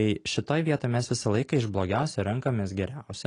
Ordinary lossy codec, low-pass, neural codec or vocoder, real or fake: AAC, 32 kbps; 9.9 kHz; none; real